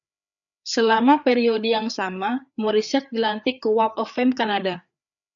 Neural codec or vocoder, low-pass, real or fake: codec, 16 kHz, 4 kbps, FreqCodec, larger model; 7.2 kHz; fake